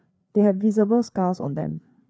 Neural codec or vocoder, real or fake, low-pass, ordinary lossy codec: codec, 16 kHz, 4 kbps, FreqCodec, larger model; fake; none; none